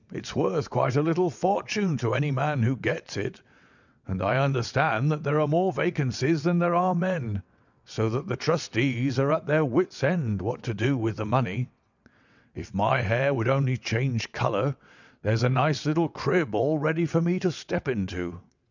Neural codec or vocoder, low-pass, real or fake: vocoder, 22.05 kHz, 80 mel bands, WaveNeXt; 7.2 kHz; fake